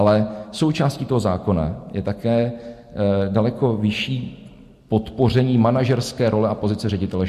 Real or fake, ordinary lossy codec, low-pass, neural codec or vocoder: real; MP3, 64 kbps; 14.4 kHz; none